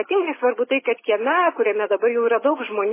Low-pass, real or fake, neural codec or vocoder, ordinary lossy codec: 3.6 kHz; real; none; MP3, 16 kbps